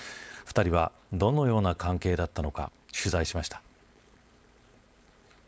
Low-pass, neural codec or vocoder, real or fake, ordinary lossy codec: none; codec, 16 kHz, 4.8 kbps, FACodec; fake; none